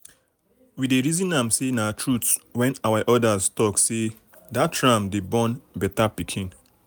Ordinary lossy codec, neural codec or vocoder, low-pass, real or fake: none; none; none; real